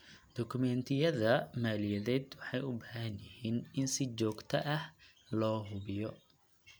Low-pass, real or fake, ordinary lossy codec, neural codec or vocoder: none; real; none; none